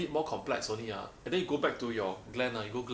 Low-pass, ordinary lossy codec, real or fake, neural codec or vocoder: none; none; real; none